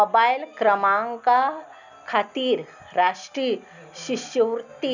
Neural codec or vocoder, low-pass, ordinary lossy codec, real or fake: none; 7.2 kHz; none; real